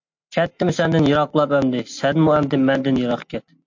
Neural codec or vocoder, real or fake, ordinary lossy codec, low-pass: none; real; MP3, 48 kbps; 7.2 kHz